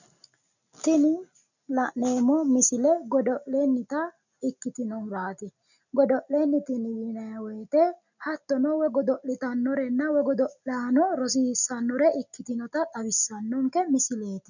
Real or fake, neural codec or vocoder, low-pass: real; none; 7.2 kHz